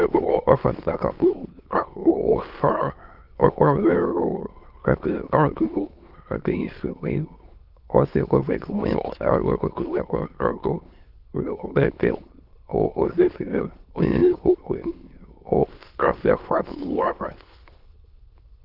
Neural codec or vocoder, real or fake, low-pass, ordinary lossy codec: autoencoder, 22.05 kHz, a latent of 192 numbers a frame, VITS, trained on many speakers; fake; 5.4 kHz; Opus, 32 kbps